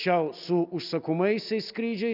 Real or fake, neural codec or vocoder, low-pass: real; none; 5.4 kHz